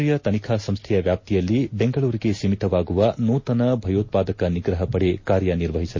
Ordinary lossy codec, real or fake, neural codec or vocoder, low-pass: MP3, 32 kbps; real; none; 7.2 kHz